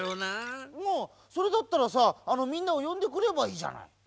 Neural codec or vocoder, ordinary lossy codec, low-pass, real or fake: none; none; none; real